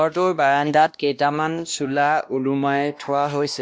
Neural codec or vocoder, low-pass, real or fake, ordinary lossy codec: codec, 16 kHz, 1 kbps, X-Codec, WavLM features, trained on Multilingual LibriSpeech; none; fake; none